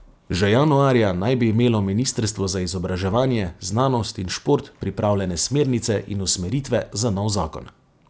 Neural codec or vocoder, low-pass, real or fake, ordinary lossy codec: none; none; real; none